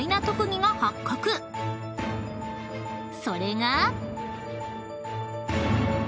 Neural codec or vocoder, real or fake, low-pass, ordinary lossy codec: none; real; none; none